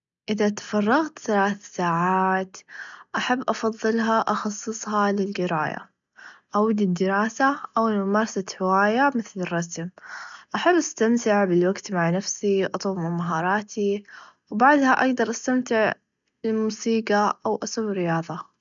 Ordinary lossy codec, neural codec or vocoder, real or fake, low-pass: none; none; real; 7.2 kHz